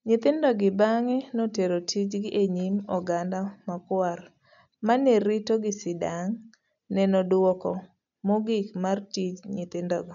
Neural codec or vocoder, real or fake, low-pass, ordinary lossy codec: none; real; 7.2 kHz; none